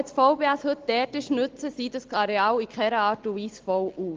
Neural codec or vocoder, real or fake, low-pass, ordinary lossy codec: none; real; 7.2 kHz; Opus, 16 kbps